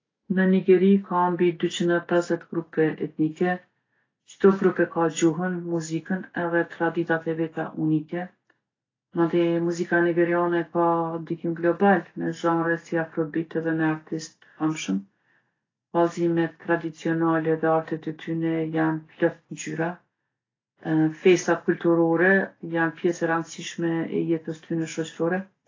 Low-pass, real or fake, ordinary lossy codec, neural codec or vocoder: 7.2 kHz; real; AAC, 32 kbps; none